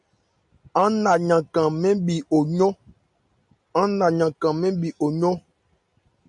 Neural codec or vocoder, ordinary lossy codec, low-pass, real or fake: none; MP3, 64 kbps; 10.8 kHz; real